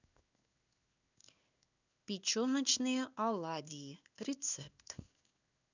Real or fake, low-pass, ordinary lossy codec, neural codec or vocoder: fake; 7.2 kHz; none; codec, 16 kHz in and 24 kHz out, 1 kbps, XY-Tokenizer